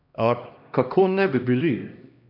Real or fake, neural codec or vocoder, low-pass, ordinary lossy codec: fake; codec, 16 kHz, 1 kbps, X-Codec, HuBERT features, trained on LibriSpeech; 5.4 kHz; none